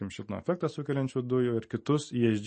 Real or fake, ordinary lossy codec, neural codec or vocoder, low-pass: real; MP3, 32 kbps; none; 10.8 kHz